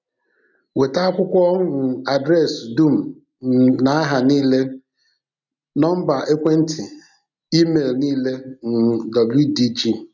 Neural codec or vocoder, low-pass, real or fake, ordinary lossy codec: none; 7.2 kHz; real; none